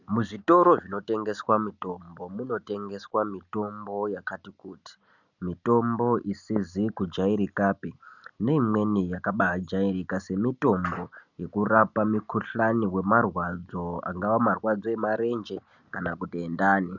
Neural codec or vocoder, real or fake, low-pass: none; real; 7.2 kHz